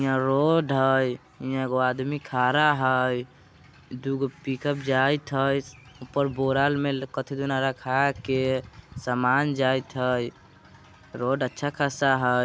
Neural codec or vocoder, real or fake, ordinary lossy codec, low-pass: none; real; none; none